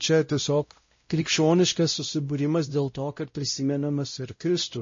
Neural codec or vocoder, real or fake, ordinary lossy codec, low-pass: codec, 16 kHz, 0.5 kbps, X-Codec, WavLM features, trained on Multilingual LibriSpeech; fake; MP3, 32 kbps; 7.2 kHz